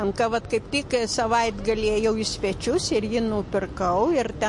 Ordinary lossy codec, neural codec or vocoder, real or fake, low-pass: MP3, 48 kbps; none; real; 10.8 kHz